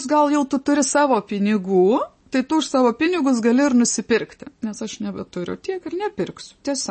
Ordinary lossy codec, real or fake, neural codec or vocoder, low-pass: MP3, 32 kbps; real; none; 9.9 kHz